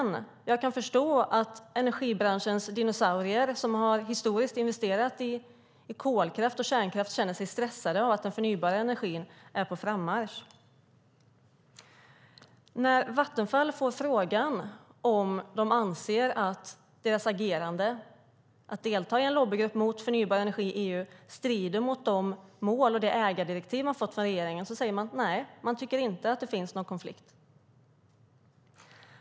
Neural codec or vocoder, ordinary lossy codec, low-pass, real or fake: none; none; none; real